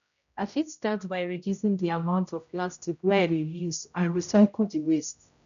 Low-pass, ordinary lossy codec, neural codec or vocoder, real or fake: 7.2 kHz; none; codec, 16 kHz, 0.5 kbps, X-Codec, HuBERT features, trained on general audio; fake